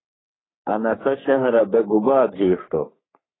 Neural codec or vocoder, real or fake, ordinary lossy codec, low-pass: codec, 44.1 kHz, 3.4 kbps, Pupu-Codec; fake; AAC, 16 kbps; 7.2 kHz